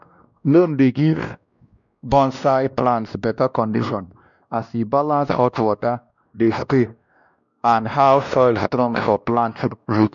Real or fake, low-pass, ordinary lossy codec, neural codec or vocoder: fake; 7.2 kHz; none; codec, 16 kHz, 1 kbps, X-Codec, WavLM features, trained on Multilingual LibriSpeech